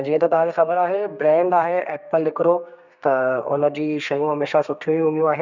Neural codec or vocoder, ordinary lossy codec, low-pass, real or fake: codec, 32 kHz, 1.9 kbps, SNAC; none; 7.2 kHz; fake